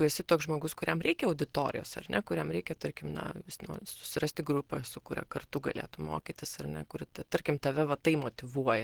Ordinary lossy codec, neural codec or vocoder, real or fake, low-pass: Opus, 16 kbps; none; real; 19.8 kHz